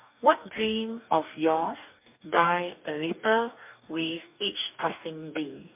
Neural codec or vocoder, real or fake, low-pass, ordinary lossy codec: codec, 44.1 kHz, 2.6 kbps, DAC; fake; 3.6 kHz; MP3, 32 kbps